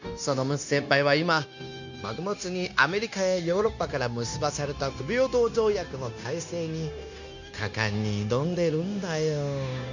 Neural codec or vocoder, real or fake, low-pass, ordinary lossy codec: codec, 16 kHz, 0.9 kbps, LongCat-Audio-Codec; fake; 7.2 kHz; none